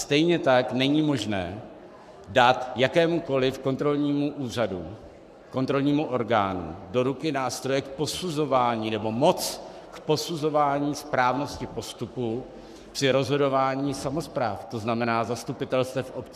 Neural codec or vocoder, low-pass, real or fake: codec, 44.1 kHz, 7.8 kbps, Pupu-Codec; 14.4 kHz; fake